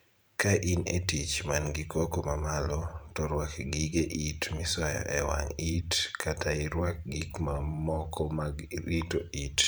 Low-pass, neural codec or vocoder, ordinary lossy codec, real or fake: none; vocoder, 44.1 kHz, 128 mel bands every 512 samples, BigVGAN v2; none; fake